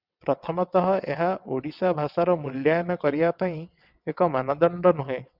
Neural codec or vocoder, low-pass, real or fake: vocoder, 22.05 kHz, 80 mel bands, WaveNeXt; 5.4 kHz; fake